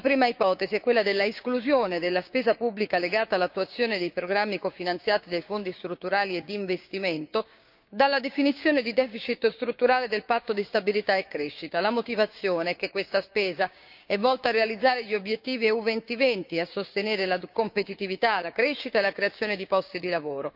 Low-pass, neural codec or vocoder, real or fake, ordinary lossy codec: 5.4 kHz; codec, 16 kHz, 6 kbps, DAC; fake; none